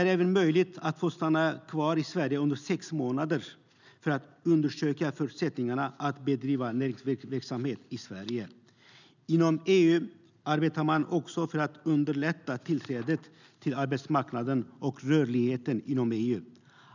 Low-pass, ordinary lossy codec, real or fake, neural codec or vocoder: 7.2 kHz; none; real; none